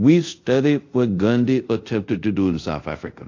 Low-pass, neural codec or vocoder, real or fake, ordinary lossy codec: 7.2 kHz; codec, 24 kHz, 0.5 kbps, DualCodec; fake; AAC, 48 kbps